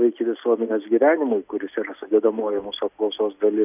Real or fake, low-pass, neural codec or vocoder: real; 3.6 kHz; none